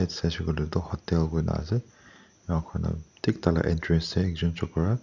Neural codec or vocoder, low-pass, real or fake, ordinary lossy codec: none; 7.2 kHz; real; none